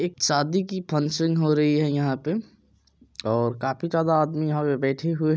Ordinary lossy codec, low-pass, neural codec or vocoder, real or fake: none; none; none; real